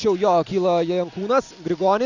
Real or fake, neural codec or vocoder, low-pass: real; none; 7.2 kHz